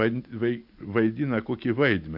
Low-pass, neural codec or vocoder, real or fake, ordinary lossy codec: 5.4 kHz; none; real; AAC, 48 kbps